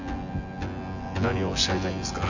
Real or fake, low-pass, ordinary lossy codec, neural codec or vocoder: fake; 7.2 kHz; none; vocoder, 24 kHz, 100 mel bands, Vocos